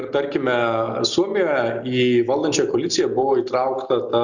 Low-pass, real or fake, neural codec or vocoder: 7.2 kHz; real; none